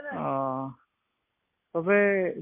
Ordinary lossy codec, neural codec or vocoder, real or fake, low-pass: MP3, 24 kbps; none; real; 3.6 kHz